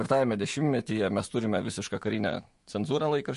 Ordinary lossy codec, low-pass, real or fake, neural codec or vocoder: MP3, 48 kbps; 14.4 kHz; fake; autoencoder, 48 kHz, 128 numbers a frame, DAC-VAE, trained on Japanese speech